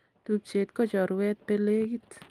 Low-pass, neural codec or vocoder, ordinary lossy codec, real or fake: 14.4 kHz; none; Opus, 32 kbps; real